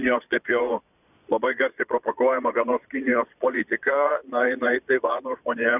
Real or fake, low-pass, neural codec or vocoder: fake; 3.6 kHz; vocoder, 22.05 kHz, 80 mel bands, Vocos